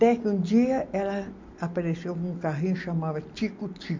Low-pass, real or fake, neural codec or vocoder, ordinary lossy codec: 7.2 kHz; real; none; MP3, 64 kbps